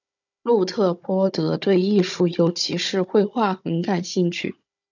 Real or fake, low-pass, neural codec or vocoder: fake; 7.2 kHz; codec, 16 kHz, 16 kbps, FunCodec, trained on Chinese and English, 50 frames a second